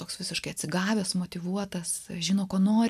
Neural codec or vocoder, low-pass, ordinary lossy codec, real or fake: none; 14.4 kHz; MP3, 96 kbps; real